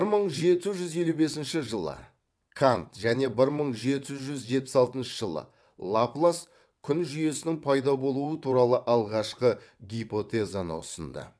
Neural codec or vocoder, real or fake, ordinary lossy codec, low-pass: vocoder, 22.05 kHz, 80 mel bands, WaveNeXt; fake; none; none